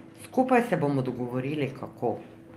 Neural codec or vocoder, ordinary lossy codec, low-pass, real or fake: none; Opus, 32 kbps; 14.4 kHz; real